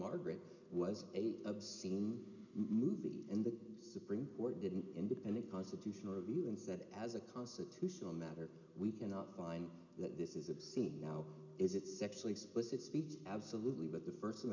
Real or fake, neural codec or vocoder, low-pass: real; none; 7.2 kHz